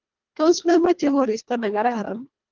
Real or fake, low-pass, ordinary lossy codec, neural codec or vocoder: fake; 7.2 kHz; Opus, 32 kbps; codec, 24 kHz, 1.5 kbps, HILCodec